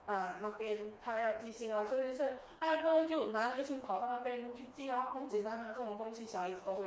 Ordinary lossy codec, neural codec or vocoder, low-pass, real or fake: none; codec, 16 kHz, 2 kbps, FreqCodec, smaller model; none; fake